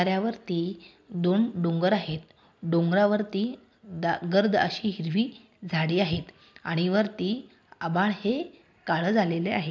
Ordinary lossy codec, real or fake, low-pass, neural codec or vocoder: none; real; none; none